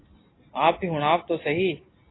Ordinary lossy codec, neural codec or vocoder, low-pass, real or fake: AAC, 16 kbps; none; 7.2 kHz; real